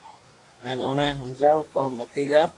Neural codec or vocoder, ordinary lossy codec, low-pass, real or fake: codec, 24 kHz, 1 kbps, SNAC; AAC, 48 kbps; 10.8 kHz; fake